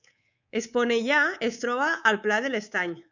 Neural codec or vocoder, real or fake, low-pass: codec, 24 kHz, 3.1 kbps, DualCodec; fake; 7.2 kHz